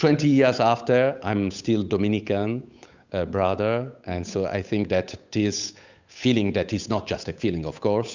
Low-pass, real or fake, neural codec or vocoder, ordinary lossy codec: 7.2 kHz; fake; codec, 16 kHz, 8 kbps, FunCodec, trained on Chinese and English, 25 frames a second; Opus, 64 kbps